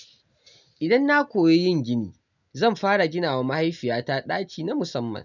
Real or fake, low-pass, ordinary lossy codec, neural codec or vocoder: real; 7.2 kHz; none; none